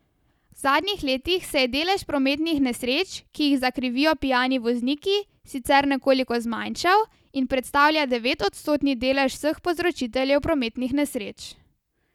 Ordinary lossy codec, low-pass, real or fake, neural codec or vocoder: none; 19.8 kHz; real; none